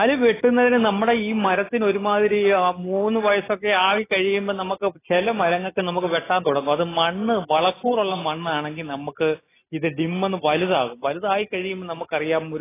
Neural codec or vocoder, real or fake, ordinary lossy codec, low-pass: none; real; AAC, 16 kbps; 3.6 kHz